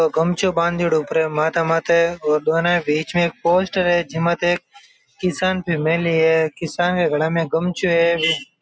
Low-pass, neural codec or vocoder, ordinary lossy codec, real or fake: none; none; none; real